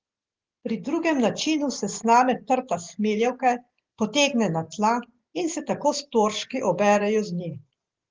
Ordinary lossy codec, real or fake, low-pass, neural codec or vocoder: Opus, 16 kbps; real; 7.2 kHz; none